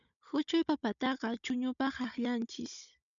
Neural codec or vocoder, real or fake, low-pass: codec, 16 kHz, 16 kbps, FunCodec, trained on Chinese and English, 50 frames a second; fake; 7.2 kHz